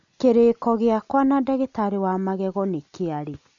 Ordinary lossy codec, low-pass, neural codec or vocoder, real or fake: none; 7.2 kHz; none; real